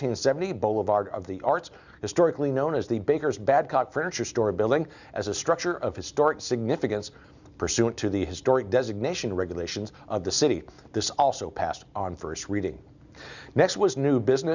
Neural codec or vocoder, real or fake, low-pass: none; real; 7.2 kHz